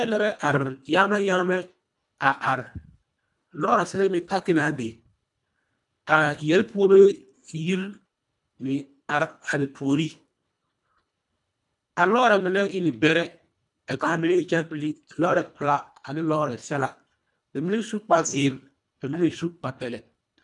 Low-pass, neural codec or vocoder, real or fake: 10.8 kHz; codec, 24 kHz, 1.5 kbps, HILCodec; fake